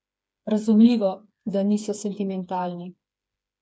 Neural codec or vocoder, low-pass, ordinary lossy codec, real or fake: codec, 16 kHz, 4 kbps, FreqCodec, smaller model; none; none; fake